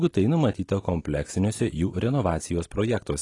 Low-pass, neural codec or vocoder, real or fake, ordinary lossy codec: 10.8 kHz; none; real; AAC, 32 kbps